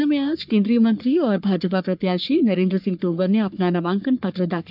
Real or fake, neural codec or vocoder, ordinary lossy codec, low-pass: fake; codec, 44.1 kHz, 3.4 kbps, Pupu-Codec; none; 5.4 kHz